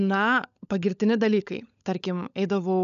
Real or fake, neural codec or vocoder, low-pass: fake; codec, 16 kHz, 16 kbps, FunCodec, trained on LibriTTS, 50 frames a second; 7.2 kHz